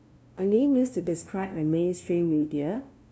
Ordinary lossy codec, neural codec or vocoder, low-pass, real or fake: none; codec, 16 kHz, 0.5 kbps, FunCodec, trained on LibriTTS, 25 frames a second; none; fake